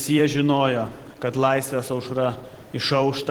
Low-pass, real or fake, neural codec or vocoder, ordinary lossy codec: 19.8 kHz; fake; vocoder, 44.1 kHz, 128 mel bands every 512 samples, BigVGAN v2; Opus, 16 kbps